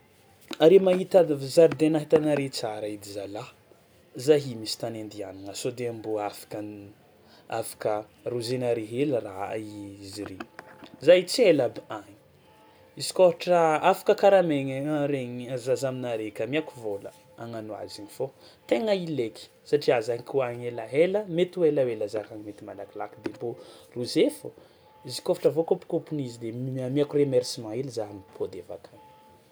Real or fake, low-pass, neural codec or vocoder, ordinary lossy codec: real; none; none; none